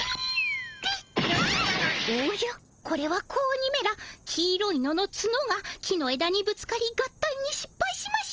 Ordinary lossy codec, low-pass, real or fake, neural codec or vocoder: Opus, 24 kbps; 7.2 kHz; real; none